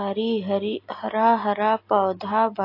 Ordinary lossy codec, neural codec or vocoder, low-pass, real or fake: AAC, 32 kbps; none; 5.4 kHz; real